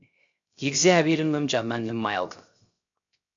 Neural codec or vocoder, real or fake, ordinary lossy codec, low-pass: codec, 16 kHz, 0.3 kbps, FocalCodec; fake; MP3, 64 kbps; 7.2 kHz